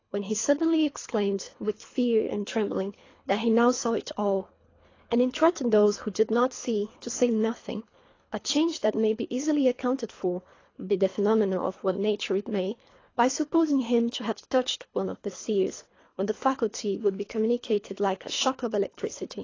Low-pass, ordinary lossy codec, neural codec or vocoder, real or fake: 7.2 kHz; AAC, 32 kbps; codec, 24 kHz, 3 kbps, HILCodec; fake